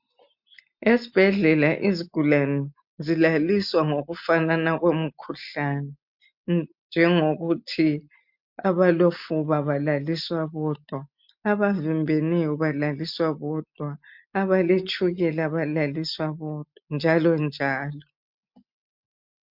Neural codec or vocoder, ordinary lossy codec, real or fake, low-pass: none; MP3, 48 kbps; real; 5.4 kHz